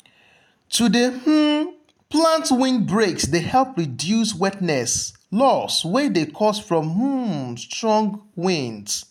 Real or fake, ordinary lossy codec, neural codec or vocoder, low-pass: real; none; none; 19.8 kHz